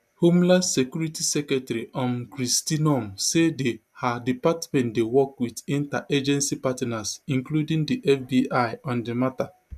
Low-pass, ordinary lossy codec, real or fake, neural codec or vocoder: 14.4 kHz; none; real; none